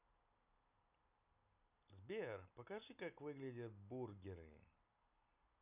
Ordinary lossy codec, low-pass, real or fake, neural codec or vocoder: none; 3.6 kHz; real; none